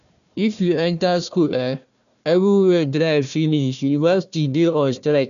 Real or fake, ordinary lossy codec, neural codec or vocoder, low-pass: fake; none; codec, 16 kHz, 1 kbps, FunCodec, trained on Chinese and English, 50 frames a second; 7.2 kHz